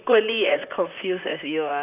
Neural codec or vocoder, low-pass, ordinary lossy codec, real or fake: vocoder, 44.1 kHz, 128 mel bands, Pupu-Vocoder; 3.6 kHz; none; fake